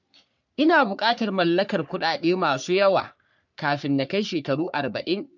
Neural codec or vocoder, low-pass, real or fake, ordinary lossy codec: codec, 44.1 kHz, 3.4 kbps, Pupu-Codec; 7.2 kHz; fake; none